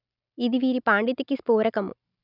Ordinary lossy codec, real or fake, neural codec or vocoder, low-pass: none; real; none; 5.4 kHz